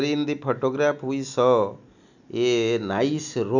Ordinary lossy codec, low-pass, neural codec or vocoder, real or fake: none; 7.2 kHz; none; real